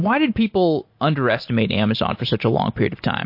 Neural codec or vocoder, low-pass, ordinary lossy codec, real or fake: none; 5.4 kHz; MP3, 32 kbps; real